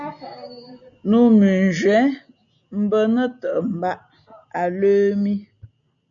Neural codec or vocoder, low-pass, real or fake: none; 7.2 kHz; real